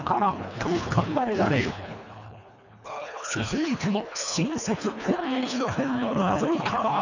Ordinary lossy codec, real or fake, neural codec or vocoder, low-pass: none; fake; codec, 24 kHz, 1.5 kbps, HILCodec; 7.2 kHz